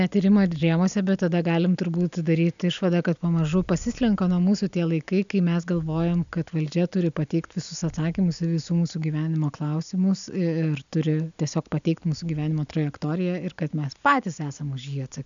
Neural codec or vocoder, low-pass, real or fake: none; 7.2 kHz; real